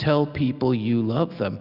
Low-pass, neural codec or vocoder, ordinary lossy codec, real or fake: 5.4 kHz; none; Opus, 64 kbps; real